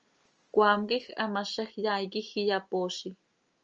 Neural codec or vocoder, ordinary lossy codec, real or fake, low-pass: none; Opus, 24 kbps; real; 7.2 kHz